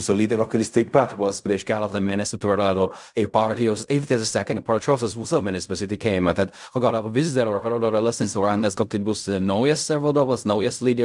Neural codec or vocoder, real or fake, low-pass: codec, 16 kHz in and 24 kHz out, 0.4 kbps, LongCat-Audio-Codec, fine tuned four codebook decoder; fake; 10.8 kHz